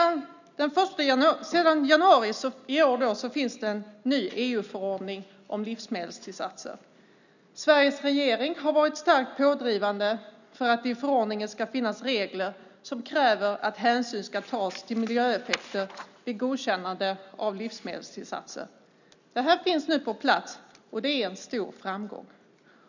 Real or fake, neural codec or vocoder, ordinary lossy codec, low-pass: real; none; none; 7.2 kHz